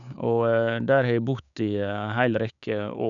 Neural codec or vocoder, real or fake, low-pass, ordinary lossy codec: codec, 16 kHz, 6 kbps, DAC; fake; 7.2 kHz; none